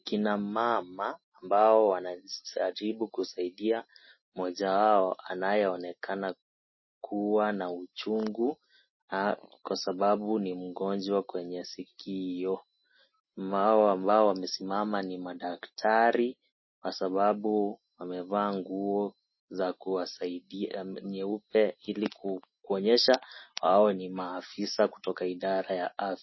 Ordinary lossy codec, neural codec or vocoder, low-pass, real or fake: MP3, 24 kbps; none; 7.2 kHz; real